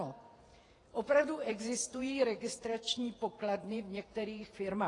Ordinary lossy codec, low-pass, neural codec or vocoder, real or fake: AAC, 32 kbps; 10.8 kHz; vocoder, 48 kHz, 128 mel bands, Vocos; fake